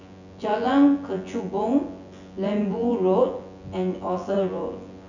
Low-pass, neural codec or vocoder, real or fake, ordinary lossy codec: 7.2 kHz; vocoder, 24 kHz, 100 mel bands, Vocos; fake; none